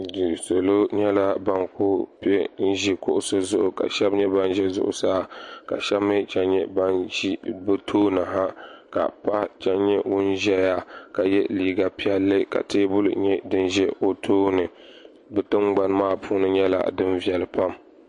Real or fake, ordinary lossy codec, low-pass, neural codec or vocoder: real; MP3, 48 kbps; 10.8 kHz; none